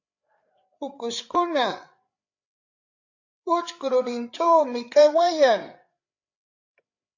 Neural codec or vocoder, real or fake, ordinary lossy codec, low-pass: codec, 16 kHz, 8 kbps, FreqCodec, larger model; fake; AAC, 48 kbps; 7.2 kHz